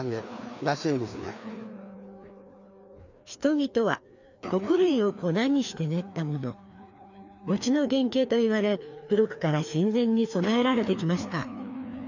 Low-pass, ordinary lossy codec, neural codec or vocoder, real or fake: 7.2 kHz; none; codec, 16 kHz, 2 kbps, FreqCodec, larger model; fake